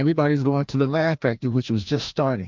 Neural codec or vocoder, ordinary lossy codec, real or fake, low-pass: codec, 16 kHz, 1 kbps, FreqCodec, larger model; MP3, 64 kbps; fake; 7.2 kHz